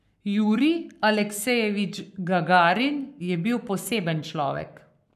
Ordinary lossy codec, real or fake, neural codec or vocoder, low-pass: none; fake; codec, 44.1 kHz, 7.8 kbps, Pupu-Codec; 14.4 kHz